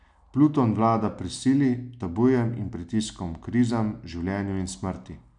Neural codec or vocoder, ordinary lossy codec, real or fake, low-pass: none; none; real; 9.9 kHz